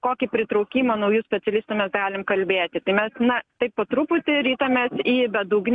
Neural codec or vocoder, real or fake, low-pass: none; real; 9.9 kHz